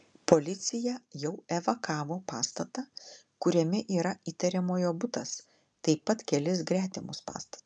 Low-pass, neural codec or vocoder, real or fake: 9.9 kHz; none; real